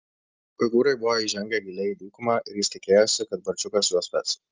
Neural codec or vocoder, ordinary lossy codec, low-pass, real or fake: none; Opus, 24 kbps; 7.2 kHz; real